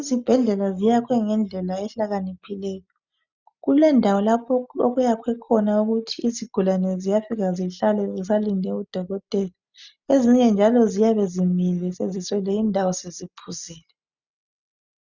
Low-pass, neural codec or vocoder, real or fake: 7.2 kHz; none; real